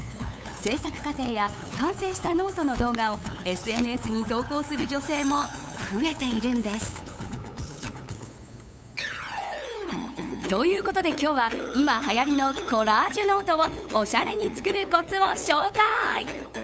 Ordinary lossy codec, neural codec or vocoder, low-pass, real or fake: none; codec, 16 kHz, 8 kbps, FunCodec, trained on LibriTTS, 25 frames a second; none; fake